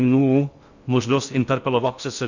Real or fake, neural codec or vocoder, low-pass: fake; codec, 16 kHz in and 24 kHz out, 0.6 kbps, FocalCodec, streaming, 4096 codes; 7.2 kHz